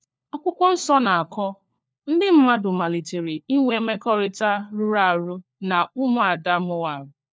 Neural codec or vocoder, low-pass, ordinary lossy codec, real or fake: codec, 16 kHz, 4 kbps, FunCodec, trained on LibriTTS, 50 frames a second; none; none; fake